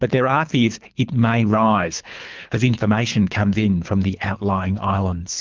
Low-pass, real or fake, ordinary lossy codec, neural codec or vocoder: 7.2 kHz; fake; Opus, 32 kbps; codec, 24 kHz, 3 kbps, HILCodec